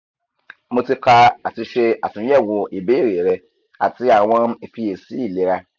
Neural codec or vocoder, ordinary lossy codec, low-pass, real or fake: none; none; 7.2 kHz; real